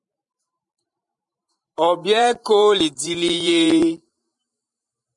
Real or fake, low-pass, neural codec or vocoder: fake; 10.8 kHz; vocoder, 44.1 kHz, 128 mel bands every 512 samples, BigVGAN v2